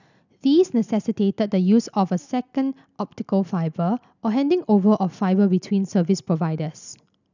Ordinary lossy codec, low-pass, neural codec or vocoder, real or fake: none; 7.2 kHz; none; real